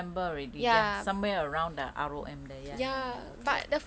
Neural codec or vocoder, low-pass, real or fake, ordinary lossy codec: none; none; real; none